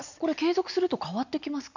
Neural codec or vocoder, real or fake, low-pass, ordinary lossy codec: none; real; 7.2 kHz; none